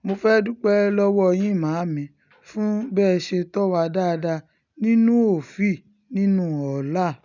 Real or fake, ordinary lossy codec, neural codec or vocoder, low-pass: real; none; none; 7.2 kHz